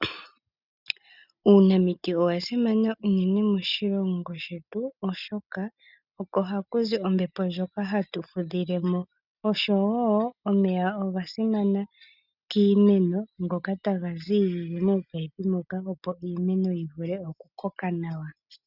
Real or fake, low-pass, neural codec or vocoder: real; 5.4 kHz; none